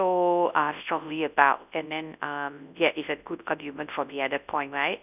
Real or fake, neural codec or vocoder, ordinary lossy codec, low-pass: fake; codec, 24 kHz, 0.9 kbps, WavTokenizer, large speech release; none; 3.6 kHz